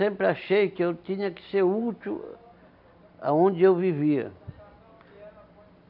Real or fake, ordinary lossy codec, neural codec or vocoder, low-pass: real; none; none; 5.4 kHz